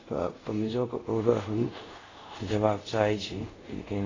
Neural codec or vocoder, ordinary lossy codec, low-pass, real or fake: codec, 24 kHz, 0.5 kbps, DualCodec; AAC, 32 kbps; 7.2 kHz; fake